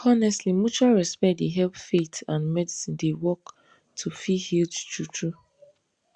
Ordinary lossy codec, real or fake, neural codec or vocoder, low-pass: Opus, 64 kbps; real; none; 9.9 kHz